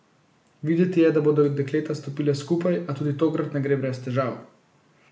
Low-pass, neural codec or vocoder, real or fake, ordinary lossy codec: none; none; real; none